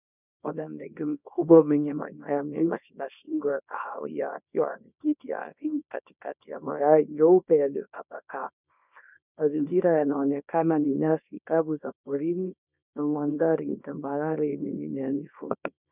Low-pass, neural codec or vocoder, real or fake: 3.6 kHz; codec, 24 kHz, 0.9 kbps, WavTokenizer, small release; fake